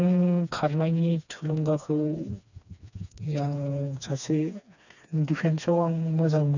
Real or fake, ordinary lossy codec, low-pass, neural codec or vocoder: fake; none; 7.2 kHz; codec, 16 kHz, 2 kbps, FreqCodec, smaller model